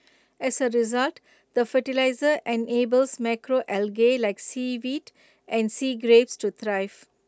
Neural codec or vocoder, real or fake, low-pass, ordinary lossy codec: none; real; none; none